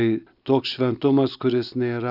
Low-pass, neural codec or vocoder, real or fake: 5.4 kHz; none; real